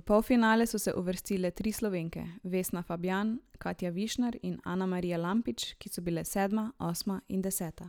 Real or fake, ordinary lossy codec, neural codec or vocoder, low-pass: real; none; none; none